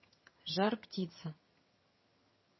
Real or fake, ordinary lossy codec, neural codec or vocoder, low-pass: fake; MP3, 24 kbps; vocoder, 22.05 kHz, 80 mel bands, HiFi-GAN; 7.2 kHz